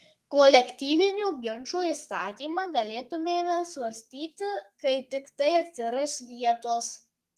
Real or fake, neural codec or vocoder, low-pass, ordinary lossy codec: fake; codec, 32 kHz, 1.9 kbps, SNAC; 14.4 kHz; Opus, 24 kbps